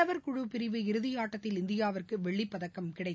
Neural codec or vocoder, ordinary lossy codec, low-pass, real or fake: none; none; none; real